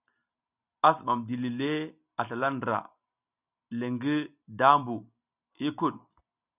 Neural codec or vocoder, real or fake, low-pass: none; real; 3.6 kHz